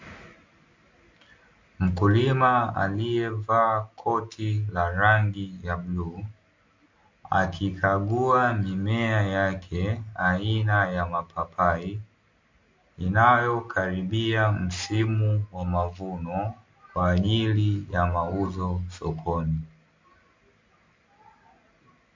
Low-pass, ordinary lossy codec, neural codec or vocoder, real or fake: 7.2 kHz; MP3, 48 kbps; none; real